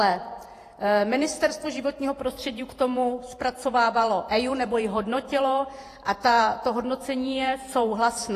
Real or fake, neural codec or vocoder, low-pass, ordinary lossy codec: fake; vocoder, 48 kHz, 128 mel bands, Vocos; 14.4 kHz; AAC, 48 kbps